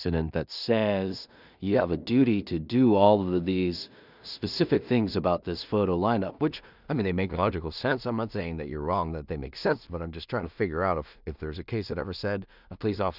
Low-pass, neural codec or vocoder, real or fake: 5.4 kHz; codec, 16 kHz in and 24 kHz out, 0.4 kbps, LongCat-Audio-Codec, two codebook decoder; fake